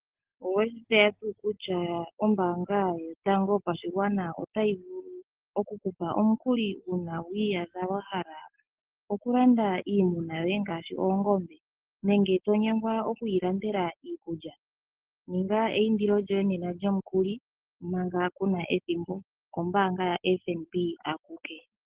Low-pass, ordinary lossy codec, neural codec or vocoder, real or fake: 3.6 kHz; Opus, 16 kbps; none; real